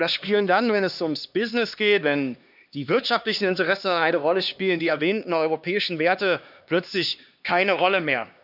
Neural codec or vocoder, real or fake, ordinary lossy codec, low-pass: codec, 16 kHz, 2 kbps, X-Codec, HuBERT features, trained on LibriSpeech; fake; none; 5.4 kHz